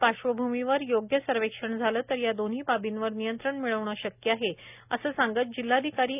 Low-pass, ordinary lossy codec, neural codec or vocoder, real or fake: 3.6 kHz; none; none; real